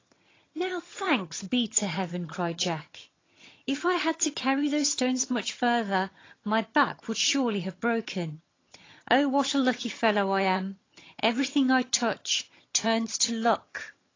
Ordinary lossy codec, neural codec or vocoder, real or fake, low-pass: AAC, 32 kbps; vocoder, 22.05 kHz, 80 mel bands, HiFi-GAN; fake; 7.2 kHz